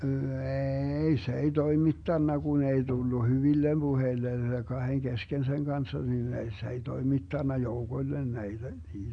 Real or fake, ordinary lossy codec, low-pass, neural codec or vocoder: real; none; 9.9 kHz; none